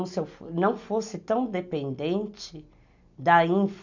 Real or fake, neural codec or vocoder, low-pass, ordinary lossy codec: real; none; 7.2 kHz; none